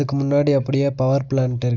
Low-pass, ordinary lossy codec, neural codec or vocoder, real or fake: 7.2 kHz; none; none; real